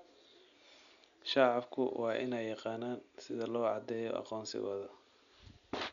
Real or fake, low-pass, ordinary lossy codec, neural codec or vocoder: real; 7.2 kHz; MP3, 96 kbps; none